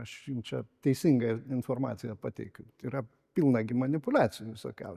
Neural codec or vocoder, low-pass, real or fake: none; 14.4 kHz; real